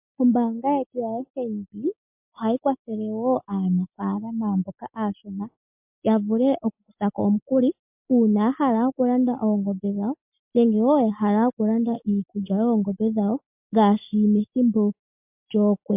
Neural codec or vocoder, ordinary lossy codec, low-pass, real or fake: none; MP3, 32 kbps; 3.6 kHz; real